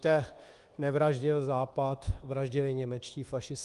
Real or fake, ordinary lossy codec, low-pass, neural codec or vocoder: fake; Opus, 24 kbps; 10.8 kHz; codec, 24 kHz, 1.2 kbps, DualCodec